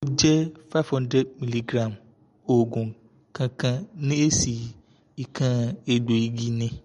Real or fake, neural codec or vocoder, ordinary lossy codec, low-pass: real; none; MP3, 48 kbps; 19.8 kHz